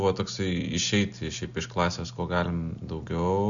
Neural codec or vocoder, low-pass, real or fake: none; 7.2 kHz; real